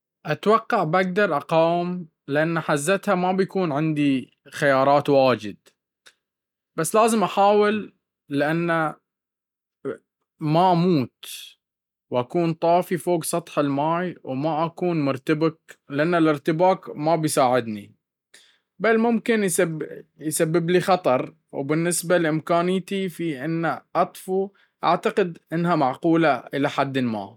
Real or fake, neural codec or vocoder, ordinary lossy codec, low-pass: real; none; none; 19.8 kHz